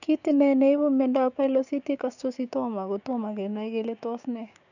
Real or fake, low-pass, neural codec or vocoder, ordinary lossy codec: fake; 7.2 kHz; codec, 16 kHz, 6 kbps, DAC; none